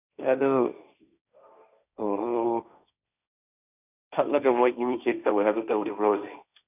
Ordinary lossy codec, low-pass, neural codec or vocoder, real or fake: none; 3.6 kHz; codec, 16 kHz, 1.1 kbps, Voila-Tokenizer; fake